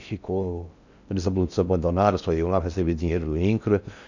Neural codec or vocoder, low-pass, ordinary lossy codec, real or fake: codec, 16 kHz in and 24 kHz out, 0.6 kbps, FocalCodec, streaming, 4096 codes; 7.2 kHz; none; fake